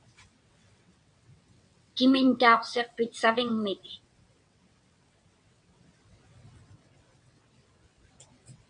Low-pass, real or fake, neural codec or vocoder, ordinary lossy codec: 9.9 kHz; fake; vocoder, 22.05 kHz, 80 mel bands, WaveNeXt; MP3, 64 kbps